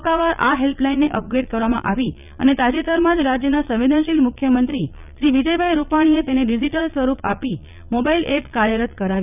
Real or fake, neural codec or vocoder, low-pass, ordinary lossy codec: fake; vocoder, 22.05 kHz, 80 mel bands, Vocos; 3.6 kHz; none